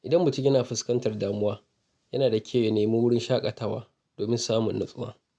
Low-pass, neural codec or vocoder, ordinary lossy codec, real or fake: none; none; none; real